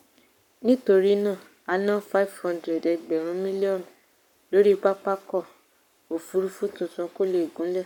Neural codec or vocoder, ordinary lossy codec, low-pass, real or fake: codec, 44.1 kHz, 7.8 kbps, Pupu-Codec; none; 19.8 kHz; fake